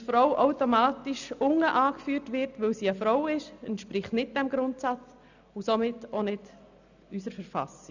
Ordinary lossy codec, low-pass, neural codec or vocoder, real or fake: none; 7.2 kHz; none; real